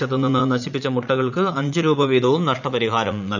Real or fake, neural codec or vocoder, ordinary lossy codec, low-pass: fake; vocoder, 44.1 kHz, 80 mel bands, Vocos; none; 7.2 kHz